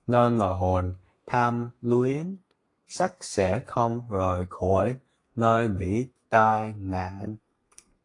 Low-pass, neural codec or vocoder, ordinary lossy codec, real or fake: 10.8 kHz; codec, 32 kHz, 1.9 kbps, SNAC; AAC, 48 kbps; fake